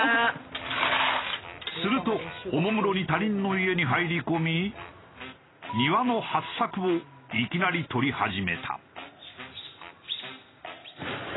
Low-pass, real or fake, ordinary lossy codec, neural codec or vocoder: 7.2 kHz; real; AAC, 16 kbps; none